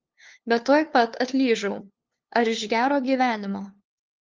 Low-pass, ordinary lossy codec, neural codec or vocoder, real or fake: 7.2 kHz; Opus, 24 kbps; codec, 16 kHz, 2 kbps, FunCodec, trained on LibriTTS, 25 frames a second; fake